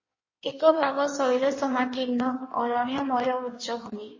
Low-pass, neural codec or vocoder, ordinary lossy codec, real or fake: 7.2 kHz; codec, 16 kHz in and 24 kHz out, 1.1 kbps, FireRedTTS-2 codec; MP3, 32 kbps; fake